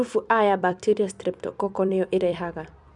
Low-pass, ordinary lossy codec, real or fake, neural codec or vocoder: 10.8 kHz; none; fake; vocoder, 44.1 kHz, 128 mel bands every 256 samples, BigVGAN v2